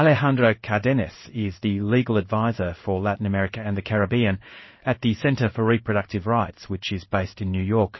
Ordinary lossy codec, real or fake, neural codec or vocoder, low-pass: MP3, 24 kbps; fake; codec, 16 kHz in and 24 kHz out, 1 kbps, XY-Tokenizer; 7.2 kHz